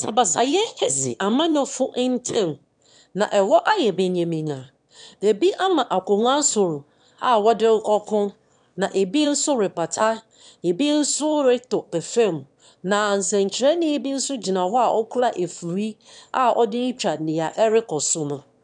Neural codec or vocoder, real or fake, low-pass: autoencoder, 22.05 kHz, a latent of 192 numbers a frame, VITS, trained on one speaker; fake; 9.9 kHz